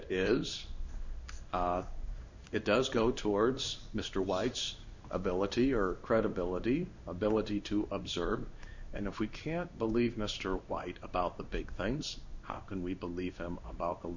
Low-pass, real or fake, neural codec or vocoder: 7.2 kHz; fake; codec, 16 kHz in and 24 kHz out, 1 kbps, XY-Tokenizer